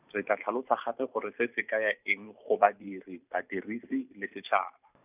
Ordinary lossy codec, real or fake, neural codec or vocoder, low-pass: none; fake; vocoder, 44.1 kHz, 128 mel bands every 512 samples, BigVGAN v2; 3.6 kHz